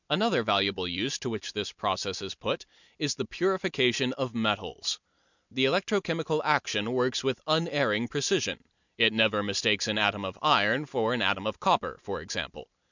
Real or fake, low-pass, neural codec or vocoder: real; 7.2 kHz; none